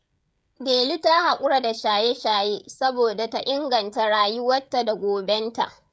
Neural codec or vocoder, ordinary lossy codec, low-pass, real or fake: codec, 16 kHz, 16 kbps, FreqCodec, smaller model; none; none; fake